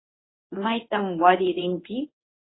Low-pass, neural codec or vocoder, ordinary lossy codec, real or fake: 7.2 kHz; codec, 24 kHz, 0.9 kbps, WavTokenizer, medium speech release version 1; AAC, 16 kbps; fake